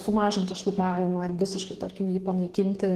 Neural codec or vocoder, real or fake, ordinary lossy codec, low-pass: codec, 44.1 kHz, 2.6 kbps, DAC; fake; Opus, 16 kbps; 14.4 kHz